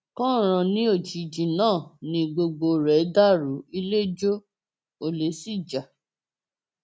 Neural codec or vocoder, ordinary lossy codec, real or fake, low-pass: none; none; real; none